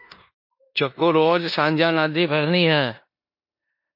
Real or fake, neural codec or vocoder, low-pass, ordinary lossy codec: fake; codec, 16 kHz in and 24 kHz out, 0.9 kbps, LongCat-Audio-Codec, four codebook decoder; 5.4 kHz; MP3, 32 kbps